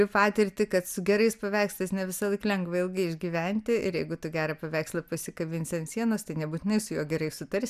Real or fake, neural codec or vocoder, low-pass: real; none; 14.4 kHz